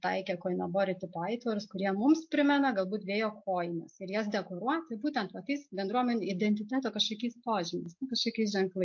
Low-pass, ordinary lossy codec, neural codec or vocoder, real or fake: 7.2 kHz; MP3, 48 kbps; none; real